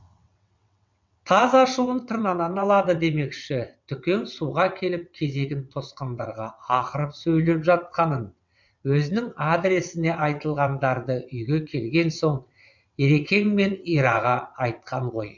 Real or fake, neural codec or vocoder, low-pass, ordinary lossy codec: fake; vocoder, 22.05 kHz, 80 mel bands, WaveNeXt; 7.2 kHz; MP3, 64 kbps